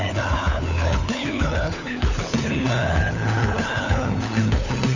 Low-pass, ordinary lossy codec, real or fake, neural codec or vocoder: 7.2 kHz; none; fake; codec, 16 kHz, 4 kbps, FunCodec, trained on LibriTTS, 50 frames a second